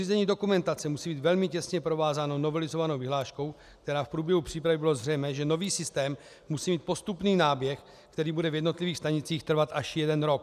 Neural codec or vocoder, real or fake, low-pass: none; real; 14.4 kHz